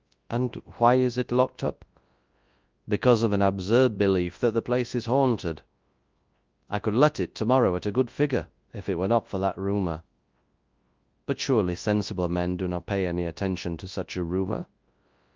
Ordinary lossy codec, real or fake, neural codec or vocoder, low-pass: Opus, 32 kbps; fake; codec, 24 kHz, 0.9 kbps, WavTokenizer, large speech release; 7.2 kHz